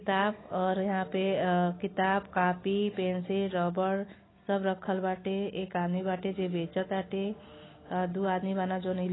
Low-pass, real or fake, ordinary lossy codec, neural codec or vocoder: 7.2 kHz; real; AAC, 16 kbps; none